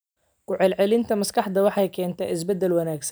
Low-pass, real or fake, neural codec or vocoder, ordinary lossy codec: none; real; none; none